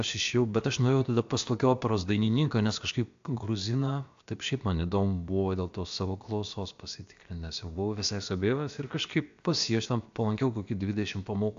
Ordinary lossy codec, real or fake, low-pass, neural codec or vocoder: AAC, 64 kbps; fake; 7.2 kHz; codec, 16 kHz, about 1 kbps, DyCAST, with the encoder's durations